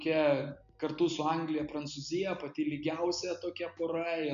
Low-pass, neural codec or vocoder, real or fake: 7.2 kHz; none; real